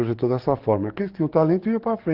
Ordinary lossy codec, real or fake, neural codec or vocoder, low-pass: Opus, 16 kbps; fake; codec, 44.1 kHz, 7.8 kbps, DAC; 5.4 kHz